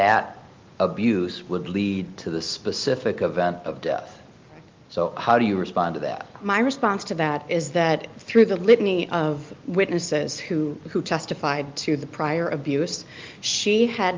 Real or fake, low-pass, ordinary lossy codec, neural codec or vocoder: real; 7.2 kHz; Opus, 32 kbps; none